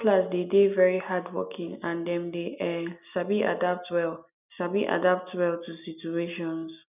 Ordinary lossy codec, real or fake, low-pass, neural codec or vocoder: none; real; 3.6 kHz; none